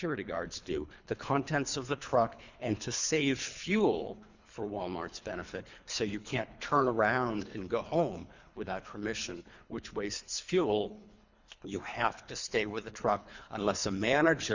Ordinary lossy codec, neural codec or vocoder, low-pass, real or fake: Opus, 64 kbps; codec, 24 kHz, 3 kbps, HILCodec; 7.2 kHz; fake